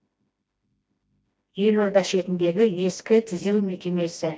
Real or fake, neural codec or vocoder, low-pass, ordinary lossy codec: fake; codec, 16 kHz, 1 kbps, FreqCodec, smaller model; none; none